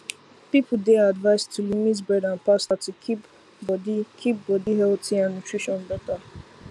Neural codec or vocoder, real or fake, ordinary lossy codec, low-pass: none; real; none; none